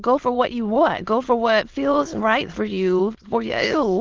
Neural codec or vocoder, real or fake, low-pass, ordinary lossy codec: autoencoder, 22.05 kHz, a latent of 192 numbers a frame, VITS, trained on many speakers; fake; 7.2 kHz; Opus, 16 kbps